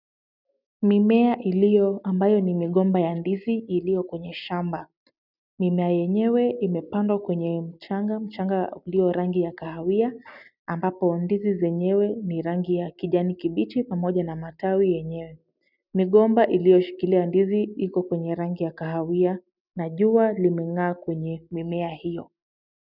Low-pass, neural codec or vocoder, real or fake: 5.4 kHz; none; real